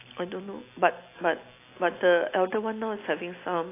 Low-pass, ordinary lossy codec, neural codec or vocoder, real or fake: 3.6 kHz; AAC, 24 kbps; none; real